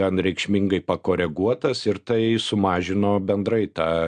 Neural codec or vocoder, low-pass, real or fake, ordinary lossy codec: none; 9.9 kHz; real; MP3, 64 kbps